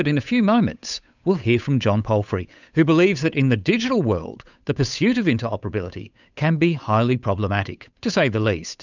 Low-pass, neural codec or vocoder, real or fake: 7.2 kHz; codec, 16 kHz, 8 kbps, FunCodec, trained on Chinese and English, 25 frames a second; fake